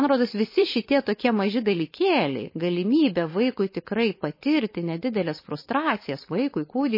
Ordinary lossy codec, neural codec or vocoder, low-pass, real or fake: MP3, 24 kbps; codec, 16 kHz, 4.8 kbps, FACodec; 5.4 kHz; fake